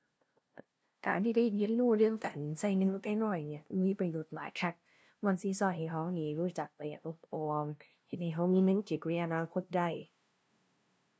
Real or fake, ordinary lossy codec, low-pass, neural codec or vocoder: fake; none; none; codec, 16 kHz, 0.5 kbps, FunCodec, trained on LibriTTS, 25 frames a second